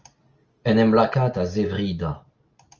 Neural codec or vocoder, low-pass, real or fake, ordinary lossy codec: none; 7.2 kHz; real; Opus, 24 kbps